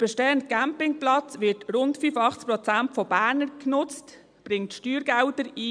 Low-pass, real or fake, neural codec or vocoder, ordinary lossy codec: 9.9 kHz; real; none; MP3, 96 kbps